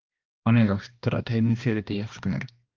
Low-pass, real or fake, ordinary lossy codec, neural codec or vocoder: 7.2 kHz; fake; Opus, 24 kbps; codec, 16 kHz, 1 kbps, X-Codec, HuBERT features, trained on balanced general audio